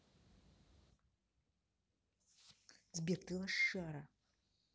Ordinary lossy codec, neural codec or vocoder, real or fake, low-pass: none; none; real; none